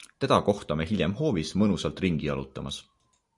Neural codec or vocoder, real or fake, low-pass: none; real; 10.8 kHz